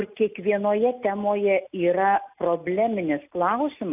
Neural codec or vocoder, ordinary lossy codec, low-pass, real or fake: none; AAC, 32 kbps; 3.6 kHz; real